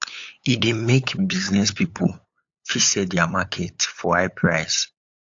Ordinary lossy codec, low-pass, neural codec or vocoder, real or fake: AAC, 48 kbps; 7.2 kHz; codec, 16 kHz, 8 kbps, FunCodec, trained on LibriTTS, 25 frames a second; fake